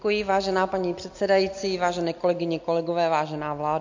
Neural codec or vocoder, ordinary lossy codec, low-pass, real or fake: none; MP3, 48 kbps; 7.2 kHz; real